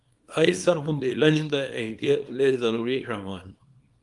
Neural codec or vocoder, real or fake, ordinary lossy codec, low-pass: codec, 24 kHz, 0.9 kbps, WavTokenizer, small release; fake; Opus, 32 kbps; 10.8 kHz